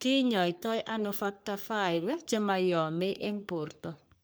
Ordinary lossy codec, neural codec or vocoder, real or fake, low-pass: none; codec, 44.1 kHz, 3.4 kbps, Pupu-Codec; fake; none